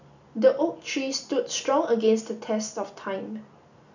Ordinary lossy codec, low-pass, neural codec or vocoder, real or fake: none; 7.2 kHz; none; real